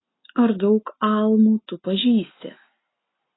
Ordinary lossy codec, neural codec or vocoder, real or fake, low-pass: AAC, 16 kbps; none; real; 7.2 kHz